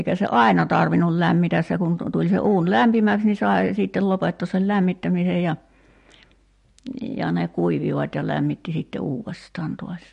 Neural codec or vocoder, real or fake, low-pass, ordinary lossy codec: none; real; 10.8 kHz; MP3, 48 kbps